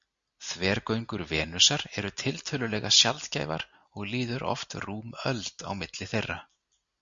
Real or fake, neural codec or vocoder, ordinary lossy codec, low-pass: real; none; Opus, 64 kbps; 7.2 kHz